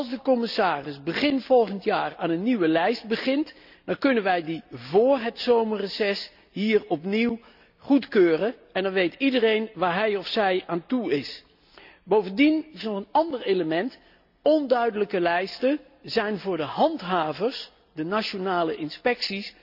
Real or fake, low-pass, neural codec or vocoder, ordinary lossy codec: real; 5.4 kHz; none; none